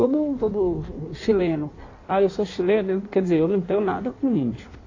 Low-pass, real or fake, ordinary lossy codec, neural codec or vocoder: 7.2 kHz; fake; AAC, 32 kbps; codec, 16 kHz in and 24 kHz out, 1.1 kbps, FireRedTTS-2 codec